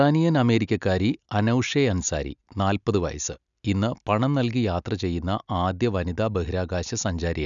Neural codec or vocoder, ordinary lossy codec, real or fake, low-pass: none; none; real; 7.2 kHz